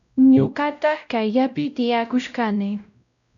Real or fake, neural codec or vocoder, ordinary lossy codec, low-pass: fake; codec, 16 kHz, 0.5 kbps, X-Codec, WavLM features, trained on Multilingual LibriSpeech; MP3, 96 kbps; 7.2 kHz